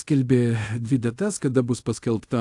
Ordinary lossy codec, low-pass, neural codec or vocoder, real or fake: AAC, 64 kbps; 10.8 kHz; codec, 24 kHz, 0.5 kbps, DualCodec; fake